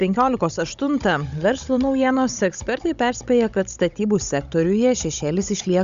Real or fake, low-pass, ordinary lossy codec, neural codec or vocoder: fake; 7.2 kHz; Opus, 64 kbps; codec, 16 kHz, 16 kbps, FunCodec, trained on Chinese and English, 50 frames a second